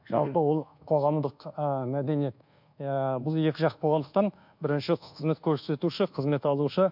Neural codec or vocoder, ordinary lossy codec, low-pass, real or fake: codec, 24 kHz, 1.2 kbps, DualCodec; MP3, 48 kbps; 5.4 kHz; fake